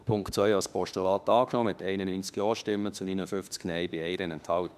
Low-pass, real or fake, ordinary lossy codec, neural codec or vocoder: 14.4 kHz; fake; none; autoencoder, 48 kHz, 32 numbers a frame, DAC-VAE, trained on Japanese speech